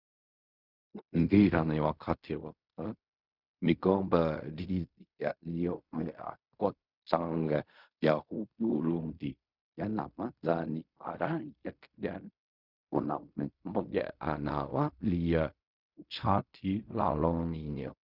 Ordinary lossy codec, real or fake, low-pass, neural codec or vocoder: Opus, 64 kbps; fake; 5.4 kHz; codec, 16 kHz in and 24 kHz out, 0.4 kbps, LongCat-Audio-Codec, fine tuned four codebook decoder